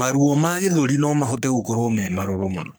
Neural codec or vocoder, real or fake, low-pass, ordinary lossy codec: codec, 44.1 kHz, 3.4 kbps, Pupu-Codec; fake; none; none